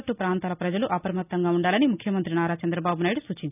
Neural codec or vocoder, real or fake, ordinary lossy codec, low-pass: none; real; none; 3.6 kHz